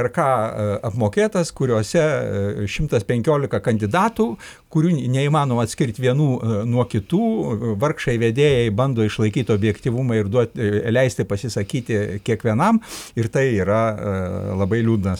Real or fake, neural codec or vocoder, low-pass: real; none; 19.8 kHz